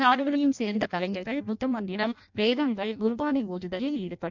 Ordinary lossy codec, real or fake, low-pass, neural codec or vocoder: MP3, 64 kbps; fake; 7.2 kHz; codec, 16 kHz in and 24 kHz out, 0.6 kbps, FireRedTTS-2 codec